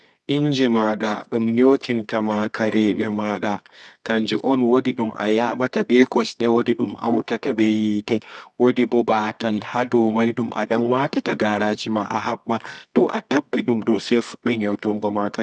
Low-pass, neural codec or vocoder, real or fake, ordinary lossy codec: none; codec, 24 kHz, 0.9 kbps, WavTokenizer, medium music audio release; fake; none